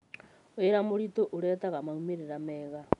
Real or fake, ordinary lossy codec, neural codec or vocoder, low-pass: real; none; none; 10.8 kHz